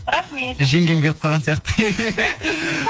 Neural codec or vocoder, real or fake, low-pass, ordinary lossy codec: codec, 16 kHz, 4 kbps, FreqCodec, smaller model; fake; none; none